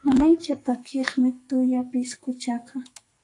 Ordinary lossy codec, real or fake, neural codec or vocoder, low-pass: AAC, 48 kbps; fake; codec, 44.1 kHz, 2.6 kbps, SNAC; 10.8 kHz